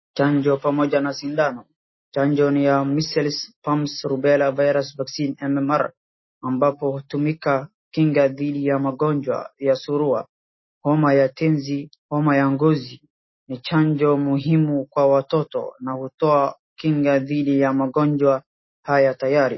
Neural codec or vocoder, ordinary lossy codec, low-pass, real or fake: none; MP3, 24 kbps; 7.2 kHz; real